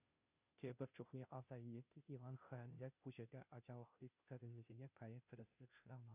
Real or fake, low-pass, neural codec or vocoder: fake; 3.6 kHz; codec, 16 kHz, 0.5 kbps, FunCodec, trained on Chinese and English, 25 frames a second